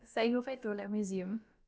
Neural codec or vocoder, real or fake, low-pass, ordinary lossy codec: codec, 16 kHz, about 1 kbps, DyCAST, with the encoder's durations; fake; none; none